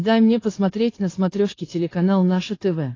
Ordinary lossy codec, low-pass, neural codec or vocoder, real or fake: AAC, 32 kbps; 7.2 kHz; codec, 16 kHz, 4.8 kbps, FACodec; fake